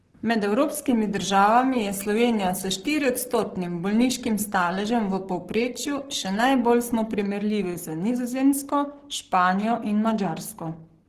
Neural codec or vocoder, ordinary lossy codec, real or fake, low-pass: codec, 44.1 kHz, 7.8 kbps, Pupu-Codec; Opus, 16 kbps; fake; 14.4 kHz